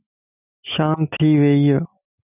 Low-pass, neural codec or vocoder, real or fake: 3.6 kHz; none; real